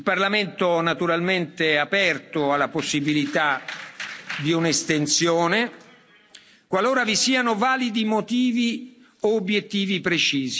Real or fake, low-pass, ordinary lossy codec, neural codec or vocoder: real; none; none; none